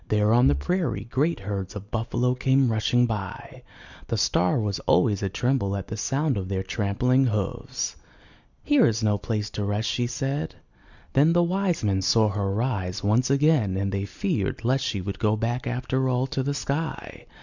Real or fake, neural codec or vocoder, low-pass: real; none; 7.2 kHz